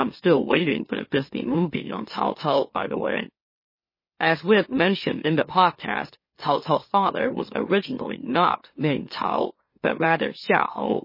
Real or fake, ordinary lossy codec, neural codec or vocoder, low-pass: fake; MP3, 24 kbps; autoencoder, 44.1 kHz, a latent of 192 numbers a frame, MeloTTS; 5.4 kHz